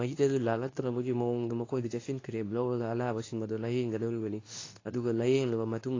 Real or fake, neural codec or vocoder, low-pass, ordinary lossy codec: fake; codec, 24 kHz, 0.9 kbps, WavTokenizer, medium speech release version 2; 7.2 kHz; AAC, 32 kbps